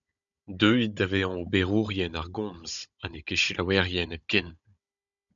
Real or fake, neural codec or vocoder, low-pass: fake; codec, 16 kHz, 16 kbps, FunCodec, trained on Chinese and English, 50 frames a second; 7.2 kHz